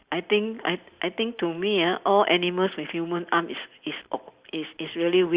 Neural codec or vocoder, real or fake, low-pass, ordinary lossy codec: none; real; 3.6 kHz; Opus, 64 kbps